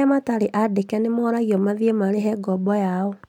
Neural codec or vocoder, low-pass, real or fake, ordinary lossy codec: none; 19.8 kHz; real; none